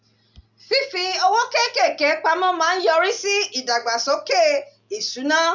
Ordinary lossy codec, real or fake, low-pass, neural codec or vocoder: none; real; 7.2 kHz; none